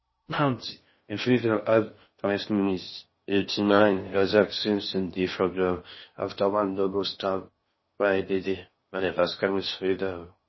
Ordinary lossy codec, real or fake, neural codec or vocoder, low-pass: MP3, 24 kbps; fake; codec, 16 kHz in and 24 kHz out, 0.6 kbps, FocalCodec, streaming, 2048 codes; 7.2 kHz